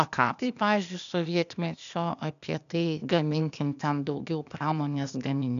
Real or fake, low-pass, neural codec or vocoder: fake; 7.2 kHz; codec, 16 kHz, 0.8 kbps, ZipCodec